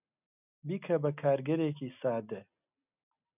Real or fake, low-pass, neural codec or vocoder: real; 3.6 kHz; none